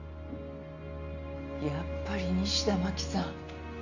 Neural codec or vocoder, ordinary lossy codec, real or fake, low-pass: none; none; real; 7.2 kHz